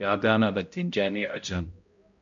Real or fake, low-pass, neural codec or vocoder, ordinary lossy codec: fake; 7.2 kHz; codec, 16 kHz, 0.5 kbps, X-Codec, HuBERT features, trained on balanced general audio; MP3, 48 kbps